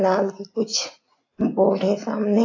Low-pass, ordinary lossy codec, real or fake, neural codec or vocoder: 7.2 kHz; AAC, 32 kbps; real; none